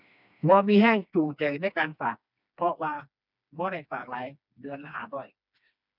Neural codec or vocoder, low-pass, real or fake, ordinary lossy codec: codec, 16 kHz, 2 kbps, FreqCodec, smaller model; 5.4 kHz; fake; none